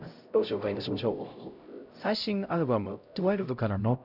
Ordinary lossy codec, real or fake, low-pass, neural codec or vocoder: none; fake; 5.4 kHz; codec, 16 kHz, 0.5 kbps, X-Codec, HuBERT features, trained on LibriSpeech